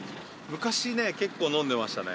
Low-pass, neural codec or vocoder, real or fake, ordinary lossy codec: none; none; real; none